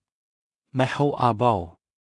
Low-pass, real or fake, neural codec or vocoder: 10.8 kHz; fake; codec, 16 kHz in and 24 kHz out, 0.4 kbps, LongCat-Audio-Codec, two codebook decoder